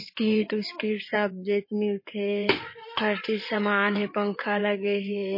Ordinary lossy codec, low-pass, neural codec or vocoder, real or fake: MP3, 24 kbps; 5.4 kHz; codec, 16 kHz in and 24 kHz out, 2.2 kbps, FireRedTTS-2 codec; fake